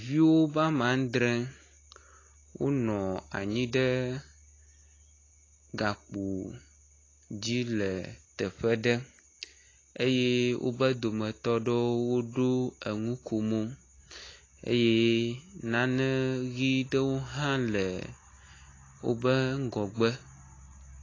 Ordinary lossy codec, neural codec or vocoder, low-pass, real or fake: AAC, 32 kbps; none; 7.2 kHz; real